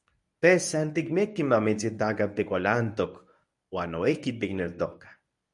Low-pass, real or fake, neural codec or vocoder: 10.8 kHz; fake; codec, 24 kHz, 0.9 kbps, WavTokenizer, medium speech release version 1